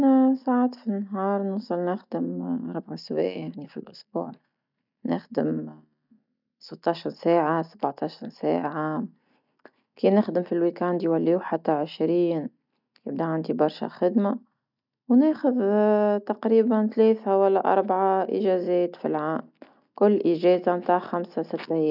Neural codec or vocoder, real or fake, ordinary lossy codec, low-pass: none; real; none; 5.4 kHz